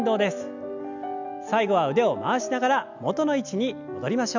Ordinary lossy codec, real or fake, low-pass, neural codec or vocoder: none; real; 7.2 kHz; none